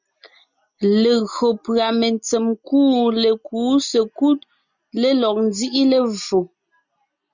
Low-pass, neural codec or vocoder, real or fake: 7.2 kHz; none; real